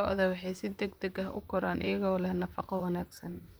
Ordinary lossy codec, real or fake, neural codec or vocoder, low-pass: none; fake; vocoder, 44.1 kHz, 128 mel bands, Pupu-Vocoder; none